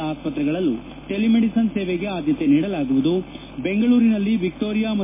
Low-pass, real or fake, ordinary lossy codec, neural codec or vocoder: 3.6 kHz; real; MP3, 16 kbps; none